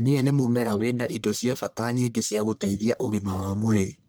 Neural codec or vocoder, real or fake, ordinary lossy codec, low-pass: codec, 44.1 kHz, 1.7 kbps, Pupu-Codec; fake; none; none